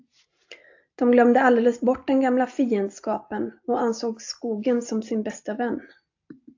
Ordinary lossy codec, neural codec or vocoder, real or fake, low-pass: AAC, 48 kbps; none; real; 7.2 kHz